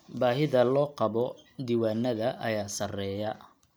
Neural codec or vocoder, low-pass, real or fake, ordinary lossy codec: none; none; real; none